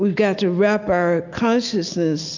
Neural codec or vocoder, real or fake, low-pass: none; real; 7.2 kHz